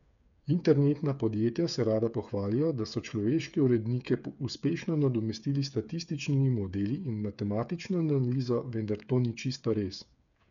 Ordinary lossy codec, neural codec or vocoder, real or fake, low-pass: none; codec, 16 kHz, 8 kbps, FreqCodec, smaller model; fake; 7.2 kHz